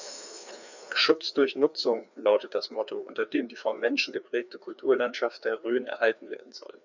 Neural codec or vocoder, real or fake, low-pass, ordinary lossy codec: codec, 16 kHz, 2 kbps, FreqCodec, larger model; fake; 7.2 kHz; none